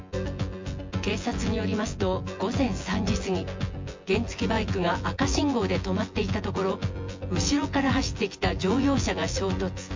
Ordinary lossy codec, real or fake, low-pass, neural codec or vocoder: MP3, 48 kbps; fake; 7.2 kHz; vocoder, 24 kHz, 100 mel bands, Vocos